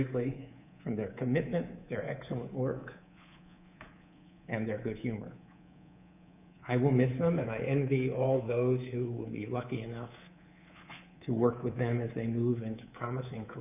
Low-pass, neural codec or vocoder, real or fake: 3.6 kHz; codec, 24 kHz, 3.1 kbps, DualCodec; fake